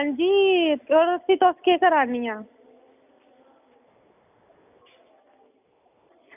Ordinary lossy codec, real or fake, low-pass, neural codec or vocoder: none; real; 3.6 kHz; none